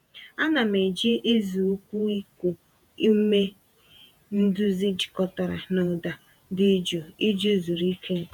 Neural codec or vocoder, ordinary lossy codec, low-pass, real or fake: vocoder, 48 kHz, 128 mel bands, Vocos; none; 19.8 kHz; fake